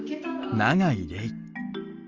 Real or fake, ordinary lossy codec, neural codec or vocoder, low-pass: real; Opus, 32 kbps; none; 7.2 kHz